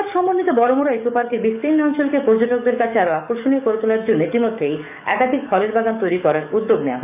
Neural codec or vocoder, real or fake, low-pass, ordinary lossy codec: codec, 44.1 kHz, 7.8 kbps, DAC; fake; 3.6 kHz; none